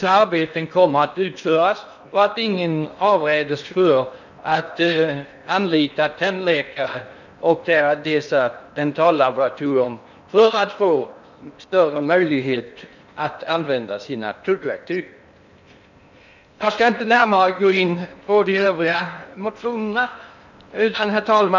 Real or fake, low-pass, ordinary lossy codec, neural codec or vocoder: fake; 7.2 kHz; none; codec, 16 kHz in and 24 kHz out, 0.8 kbps, FocalCodec, streaming, 65536 codes